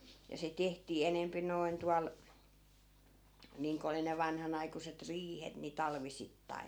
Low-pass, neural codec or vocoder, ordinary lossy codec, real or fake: none; none; none; real